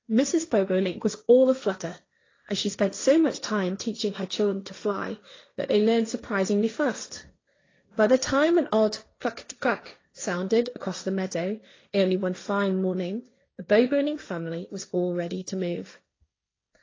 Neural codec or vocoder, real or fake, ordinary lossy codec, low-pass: codec, 16 kHz, 1.1 kbps, Voila-Tokenizer; fake; AAC, 32 kbps; 7.2 kHz